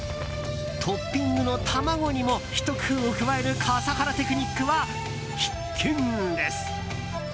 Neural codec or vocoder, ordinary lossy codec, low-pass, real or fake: none; none; none; real